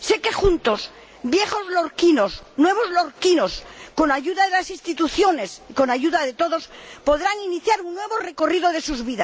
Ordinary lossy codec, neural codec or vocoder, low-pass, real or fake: none; none; none; real